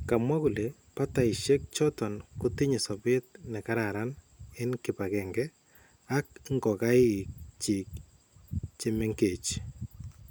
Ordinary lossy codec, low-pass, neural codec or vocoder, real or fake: none; none; none; real